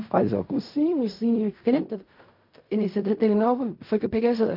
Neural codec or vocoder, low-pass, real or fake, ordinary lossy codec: codec, 16 kHz in and 24 kHz out, 0.4 kbps, LongCat-Audio-Codec, fine tuned four codebook decoder; 5.4 kHz; fake; MP3, 48 kbps